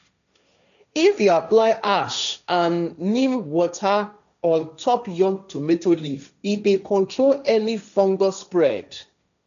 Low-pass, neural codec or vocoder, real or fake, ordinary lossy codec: 7.2 kHz; codec, 16 kHz, 1.1 kbps, Voila-Tokenizer; fake; none